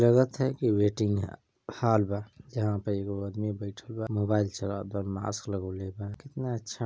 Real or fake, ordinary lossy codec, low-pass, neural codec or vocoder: real; none; none; none